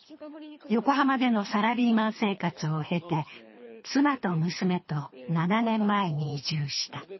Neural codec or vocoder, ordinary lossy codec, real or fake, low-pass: codec, 24 kHz, 3 kbps, HILCodec; MP3, 24 kbps; fake; 7.2 kHz